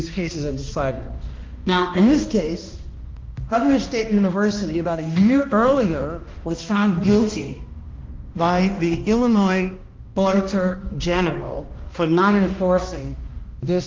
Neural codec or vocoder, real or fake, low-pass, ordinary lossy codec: codec, 16 kHz, 1 kbps, X-Codec, HuBERT features, trained on balanced general audio; fake; 7.2 kHz; Opus, 24 kbps